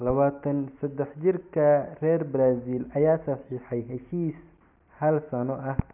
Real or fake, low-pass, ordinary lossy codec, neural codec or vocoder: real; 3.6 kHz; none; none